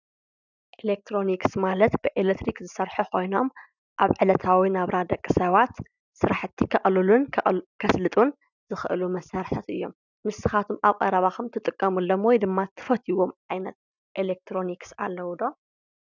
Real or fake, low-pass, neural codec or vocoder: real; 7.2 kHz; none